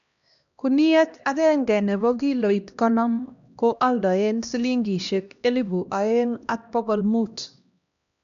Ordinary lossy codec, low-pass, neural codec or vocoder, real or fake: none; 7.2 kHz; codec, 16 kHz, 1 kbps, X-Codec, HuBERT features, trained on LibriSpeech; fake